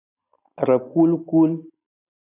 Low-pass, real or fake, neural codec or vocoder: 3.6 kHz; real; none